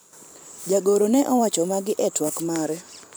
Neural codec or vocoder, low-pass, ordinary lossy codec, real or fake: vocoder, 44.1 kHz, 128 mel bands every 512 samples, BigVGAN v2; none; none; fake